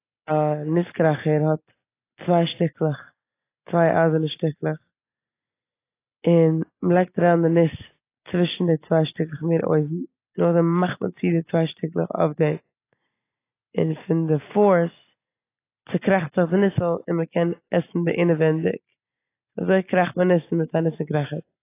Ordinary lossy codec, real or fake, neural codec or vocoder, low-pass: AAC, 24 kbps; real; none; 3.6 kHz